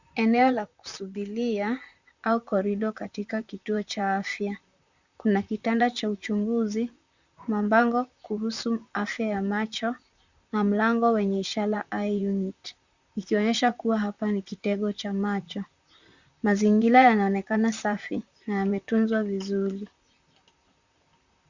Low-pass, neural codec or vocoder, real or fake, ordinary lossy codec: 7.2 kHz; vocoder, 44.1 kHz, 128 mel bands, Pupu-Vocoder; fake; Opus, 64 kbps